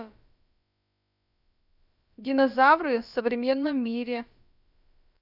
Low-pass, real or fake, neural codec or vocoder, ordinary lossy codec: 5.4 kHz; fake; codec, 16 kHz, about 1 kbps, DyCAST, with the encoder's durations; none